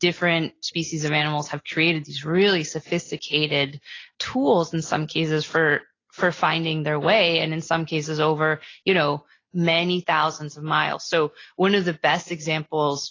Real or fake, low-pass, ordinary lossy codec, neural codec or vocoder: real; 7.2 kHz; AAC, 32 kbps; none